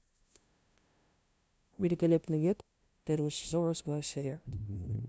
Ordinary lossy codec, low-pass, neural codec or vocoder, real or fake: none; none; codec, 16 kHz, 0.5 kbps, FunCodec, trained on LibriTTS, 25 frames a second; fake